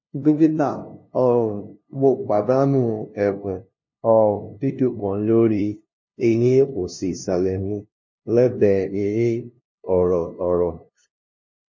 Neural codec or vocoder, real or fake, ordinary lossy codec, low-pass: codec, 16 kHz, 0.5 kbps, FunCodec, trained on LibriTTS, 25 frames a second; fake; MP3, 32 kbps; 7.2 kHz